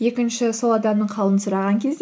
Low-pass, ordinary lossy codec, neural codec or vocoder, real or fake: none; none; none; real